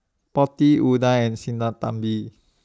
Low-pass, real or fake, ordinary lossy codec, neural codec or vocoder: none; real; none; none